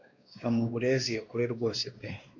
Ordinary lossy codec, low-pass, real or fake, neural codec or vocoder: AAC, 48 kbps; 7.2 kHz; fake; codec, 16 kHz, 2 kbps, X-Codec, WavLM features, trained on Multilingual LibriSpeech